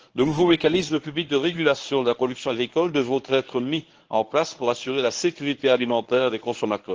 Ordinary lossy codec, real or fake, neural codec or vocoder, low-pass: Opus, 16 kbps; fake; codec, 24 kHz, 0.9 kbps, WavTokenizer, medium speech release version 1; 7.2 kHz